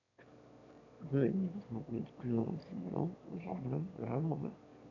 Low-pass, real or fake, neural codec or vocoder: 7.2 kHz; fake; autoencoder, 22.05 kHz, a latent of 192 numbers a frame, VITS, trained on one speaker